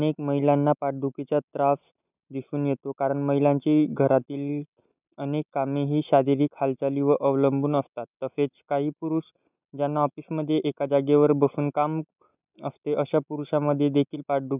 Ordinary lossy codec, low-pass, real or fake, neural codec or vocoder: none; 3.6 kHz; real; none